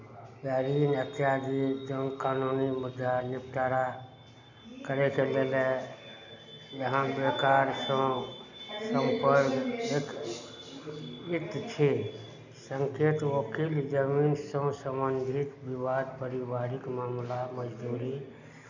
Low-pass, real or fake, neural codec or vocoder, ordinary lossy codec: 7.2 kHz; real; none; none